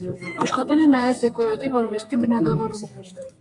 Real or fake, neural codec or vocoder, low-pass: fake; codec, 32 kHz, 1.9 kbps, SNAC; 10.8 kHz